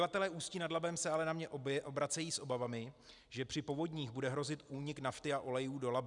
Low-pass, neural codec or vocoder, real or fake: 10.8 kHz; none; real